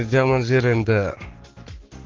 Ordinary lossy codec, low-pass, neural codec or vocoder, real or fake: Opus, 16 kbps; 7.2 kHz; codec, 44.1 kHz, 7.8 kbps, Pupu-Codec; fake